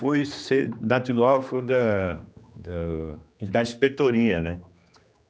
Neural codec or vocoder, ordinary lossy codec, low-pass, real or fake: codec, 16 kHz, 2 kbps, X-Codec, HuBERT features, trained on general audio; none; none; fake